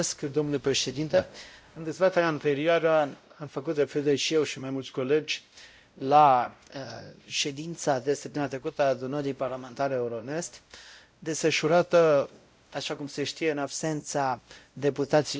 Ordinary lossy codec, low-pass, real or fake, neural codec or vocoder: none; none; fake; codec, 16 kHz, 0.5 kbps, X-Codec, WavLM features, trained on Multilingual LibriSpeech